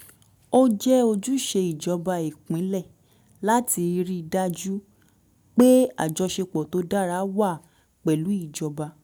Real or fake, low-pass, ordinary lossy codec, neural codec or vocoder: real; none; none; none